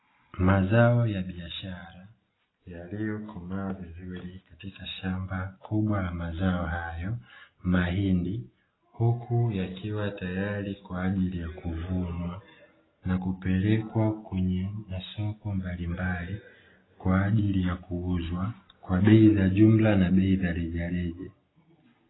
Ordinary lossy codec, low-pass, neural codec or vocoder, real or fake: AAC, 16 kbps; 7.2 kHz; none; real